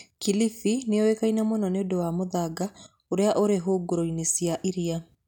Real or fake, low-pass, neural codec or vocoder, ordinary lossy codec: real; 19.8 kHz; none; none